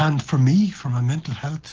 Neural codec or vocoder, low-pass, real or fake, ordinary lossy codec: none; 7.2 kHz; real; Opus, 16 kbps